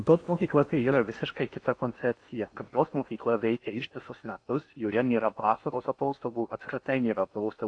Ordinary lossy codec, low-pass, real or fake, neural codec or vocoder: AAC, 48 kbps; 9.9 kHz; fake; codec, 16 kHz in and 24 kHz out, 0.8 kbps, FocalCodec, streaming, 65536 codes